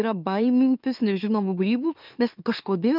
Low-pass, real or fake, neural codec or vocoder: 5.4 kHz; fake; autoencoder, 44.1 kHz, a latent of 192 numbers a frame, MeloTTS